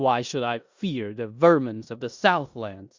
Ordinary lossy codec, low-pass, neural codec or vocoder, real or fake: Opus, 64 kbps; 7.2 kHz; codec, 16 kHz in and 24 kHz out, 0.9 kbps, LongCat-Audio-Codec, four codebook decoder; fake